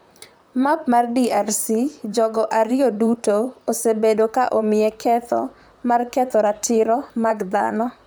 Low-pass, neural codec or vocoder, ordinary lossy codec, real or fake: none; vocoder, 44.1 kHz, 128 mel bands, Pupu-Vocoder; none; fake